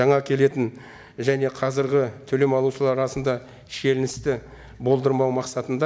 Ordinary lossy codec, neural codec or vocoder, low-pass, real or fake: none; none; none; real